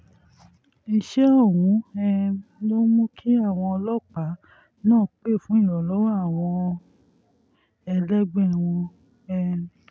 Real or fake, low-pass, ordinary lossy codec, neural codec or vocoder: real; none; none; none